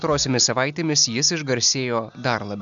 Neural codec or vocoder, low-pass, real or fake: none; 7.2 kHz; real